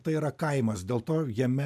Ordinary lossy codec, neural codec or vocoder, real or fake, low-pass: MP3, 96 kbps; none; real; 14.4 kHz